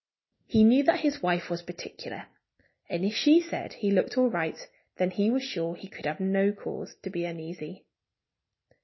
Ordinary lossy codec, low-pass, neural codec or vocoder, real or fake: MP3, 24 kbps; 7.2 kHz; none; real